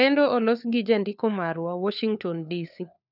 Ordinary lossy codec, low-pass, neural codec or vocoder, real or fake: none; 5.4 kHz; codec, 16 kHz, 4 kbps, FunCodec, trained on LibriTTS, 50 frames a second; fake